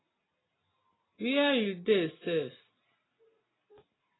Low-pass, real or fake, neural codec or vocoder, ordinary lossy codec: 7.2 kHz; real; none; AAC, 16 kbps